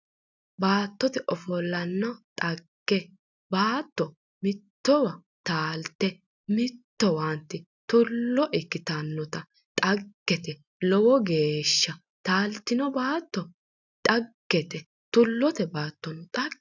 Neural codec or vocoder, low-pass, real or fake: none; 7.2 kHz; real